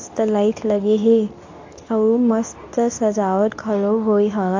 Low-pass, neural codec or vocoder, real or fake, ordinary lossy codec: 7.2 kHz; codec, 24 kHz, 0.9 kbps, WavTokenizer, medium speech release version 2; fake; AAC, 48 kbps